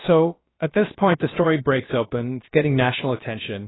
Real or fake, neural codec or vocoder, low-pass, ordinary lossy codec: fake; codec, 16 kHz, about 1 kbps, DyCAST, with the encoder's durations; 7.2 kHz; AAC, 16 kbps